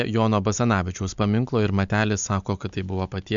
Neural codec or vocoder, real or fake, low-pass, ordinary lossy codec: none; real; 7.2 kHz; MP3, 64 kbps